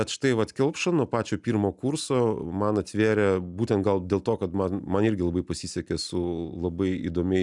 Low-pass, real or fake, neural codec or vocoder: 10.8 kHz; real; none